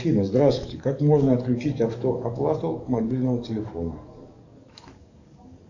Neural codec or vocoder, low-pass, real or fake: codec, 44.1 kHz, 7.8 kbps, DAC; 7.2 kHz; fake